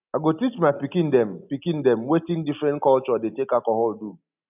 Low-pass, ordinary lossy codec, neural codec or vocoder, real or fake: 3.6 kHz; none; none; real